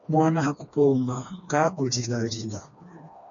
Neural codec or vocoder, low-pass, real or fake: codec, 16 kHz, 2 kbps, FreqCodec, smaller model; 7.2 kHz; fake